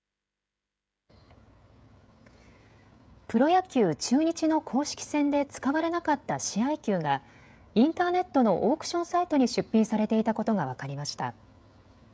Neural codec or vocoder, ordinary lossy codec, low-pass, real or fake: codec, 16 kHz, 16 kbps, FreqCodec, smaller model; none; none; fake